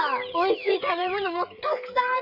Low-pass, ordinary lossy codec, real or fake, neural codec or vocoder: 5.4 kHz; none; fake; codec, 16 kHz, 16 kbps, FreqCodec, smaller model